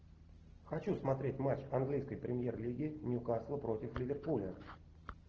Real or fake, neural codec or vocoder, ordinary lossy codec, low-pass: real; none; Opus, 16 kbps; 7.2 kHz